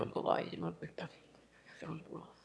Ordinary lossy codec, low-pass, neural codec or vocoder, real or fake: none; none; autoencoder, 22.05 kHz, a latent of 192 numbers a frame, VITS, trained on one speaker; fake